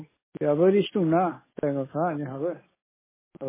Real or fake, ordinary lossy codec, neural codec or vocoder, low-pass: real; MP3, 16 kbps; none; 3.6 kHz